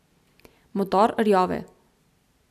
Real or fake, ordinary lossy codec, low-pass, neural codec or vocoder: real; none; 14.4 kHz; none